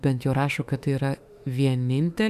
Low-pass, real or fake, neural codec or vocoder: 14.4 kHz; fake; autoencoder, 48 kHz, 32 numbers a frame, DAC-VAE, trained on Japanese speech